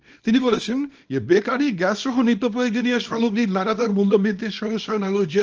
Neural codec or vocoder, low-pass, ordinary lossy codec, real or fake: codec, 24 kHz, 0.9 kbps, WavTokenizer, small release; 7.2 kHz; Opus, 24 kbps; fake